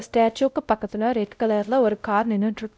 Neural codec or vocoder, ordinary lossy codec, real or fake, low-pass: codec, 16 kHz, 0.5 kbps, X-Codec, WavLM features, trained on Multilingual LibriSpeech; none; fake; none